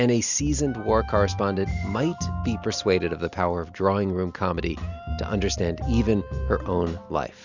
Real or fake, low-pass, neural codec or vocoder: real; 7.2 kHz; none